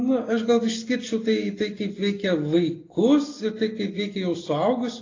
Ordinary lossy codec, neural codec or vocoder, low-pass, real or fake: AAC, 32 kbps; none; 7.2 kHz; real